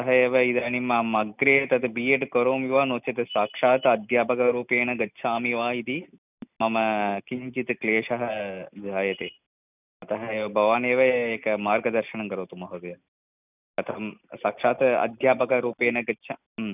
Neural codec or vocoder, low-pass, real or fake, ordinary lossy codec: none; 3.6 kHz; real; none